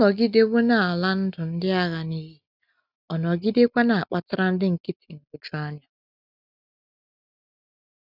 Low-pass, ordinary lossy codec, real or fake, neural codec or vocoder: 5.4 kHz; none; real; none